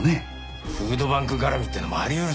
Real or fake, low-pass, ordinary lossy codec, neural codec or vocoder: real; none; none; none